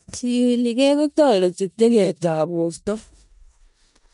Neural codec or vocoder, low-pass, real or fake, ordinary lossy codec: codec, 16 kHz in and 24 kHz out, 0.4 kbps, LongCat-Audio-Codec, four codebook decoder; 10.8 kHz; fake; none